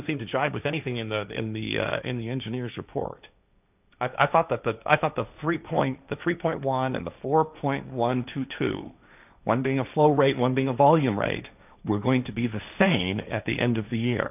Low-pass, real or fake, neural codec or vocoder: 3.6 kHz; fake; codec, 16 kHz, 1.1 kbps, Voila-Tokenizer